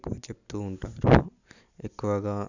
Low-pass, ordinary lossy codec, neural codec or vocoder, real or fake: 7.2 kHz; none; none; real